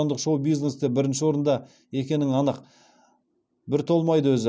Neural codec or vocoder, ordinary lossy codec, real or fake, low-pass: none; none; real; none